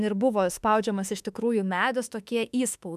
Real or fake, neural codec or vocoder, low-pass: fake; autoencoder, 48 kHz, 32 numbers a frame, DAC-VAE, trained on Japanese speech; 14.4 kHz